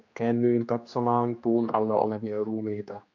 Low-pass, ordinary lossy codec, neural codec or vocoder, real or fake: 7.2 kHz; MP3, 48 kbps; codec, 16 kHz, 2 kbps, X-Codec, HuBERT features, trained on general audio; fake